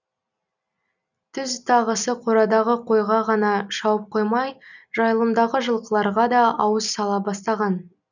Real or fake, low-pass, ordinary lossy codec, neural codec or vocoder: real; 7.2 kHz; none; none